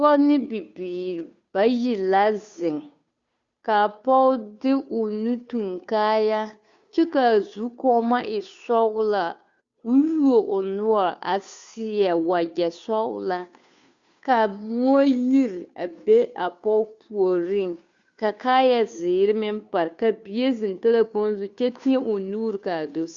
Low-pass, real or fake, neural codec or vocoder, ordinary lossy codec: 7.2 kHz; fake; codec, 16 kHz, 2 kbps, FunCodec, trained on Chinese and English, 25 frames a second; Opus, 64 kbps